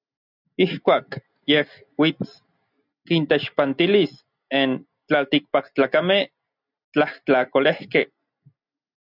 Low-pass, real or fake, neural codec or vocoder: 5.4 kHz; real; none